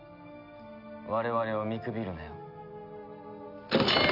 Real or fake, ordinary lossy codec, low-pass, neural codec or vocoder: real; none; 5.4 kHz; none